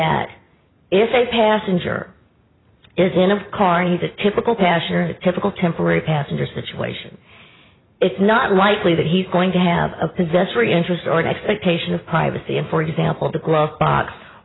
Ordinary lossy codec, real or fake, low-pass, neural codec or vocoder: AAC, 16 kbps; real; 7.2 kHz; none